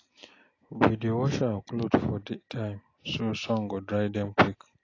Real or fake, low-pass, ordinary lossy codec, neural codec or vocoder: real; 7.2 kHz; AAC, 32 kbps; none